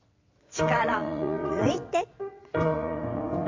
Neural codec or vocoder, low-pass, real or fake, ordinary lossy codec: vocoder, 22.05 kHz, 80 mel bands, WaveNeXt; 7.2 kHz; fake; MP3, 48 kbps